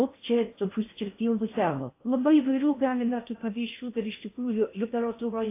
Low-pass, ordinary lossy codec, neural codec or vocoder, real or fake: 3.6 kHz; AAC, 24 kbps; codec, 16 kHz in and 24 kHz out, 0.6 kbps, FocalCodec, streaming, 4096 codes; fake